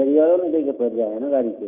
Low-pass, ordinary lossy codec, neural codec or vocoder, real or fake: 3.6 kHz; none; autoencoder, 48 kHz, 128 numbers a frame, DAC-VAE, trained on Japanese speech; fake